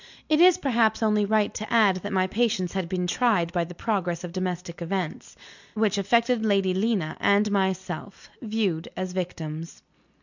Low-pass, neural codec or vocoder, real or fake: 7.2 kHz; none; real